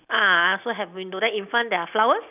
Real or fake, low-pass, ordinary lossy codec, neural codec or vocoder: real; 3.6 kHz; none; none